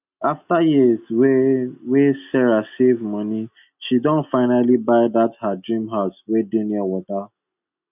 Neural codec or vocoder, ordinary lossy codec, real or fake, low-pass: none; none; real; 3.6 kHz